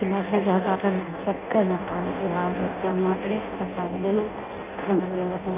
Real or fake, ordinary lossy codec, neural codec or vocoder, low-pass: fake; none; codec, 16 kHz in and 24 kHz out, 0.6 kbps, FireRedTTS-2 codec; 3.6 kHz